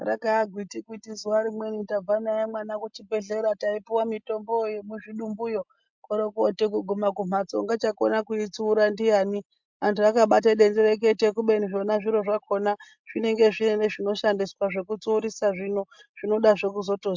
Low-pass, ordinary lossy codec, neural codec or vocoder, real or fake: 7.2 kHz; MP3, 64 kbps; none; real